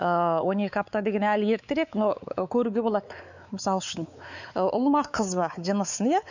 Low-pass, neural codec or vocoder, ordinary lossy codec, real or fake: 7.2 kHz; codec, 16 kHz, 4 kbps, X-Codec, WavLM features, trained on Multilingual LibriSpeech; none; fake